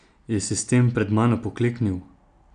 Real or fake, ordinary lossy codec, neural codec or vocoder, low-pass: real; none; none; 9.9 kHz